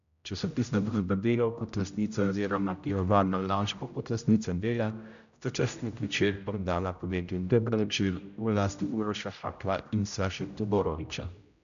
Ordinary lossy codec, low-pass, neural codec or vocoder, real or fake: none; 7.2 kHz; codec, 16 kHz, 0.5 kbps, X-Codec, HuBERT features, trained on general audio; fake